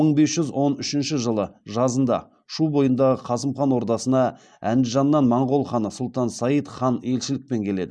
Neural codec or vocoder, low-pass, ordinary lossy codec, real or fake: none; none; none; real